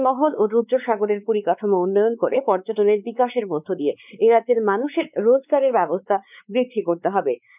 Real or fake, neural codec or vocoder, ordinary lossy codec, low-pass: fake; codec, 16 kHz, 4 kbps, X-Codec, WavLM features, trained on Multilingual LibriSpeech; none; 3.6 kHz